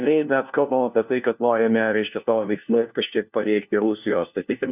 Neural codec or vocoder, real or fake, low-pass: codec, 16 kHz, 1 kbps, FunCodec, trained on LibriTTS, 50 frames a second; fake; 3.6 kHz